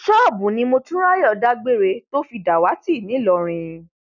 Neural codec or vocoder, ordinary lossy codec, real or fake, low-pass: none; none; real; 7.2 kHz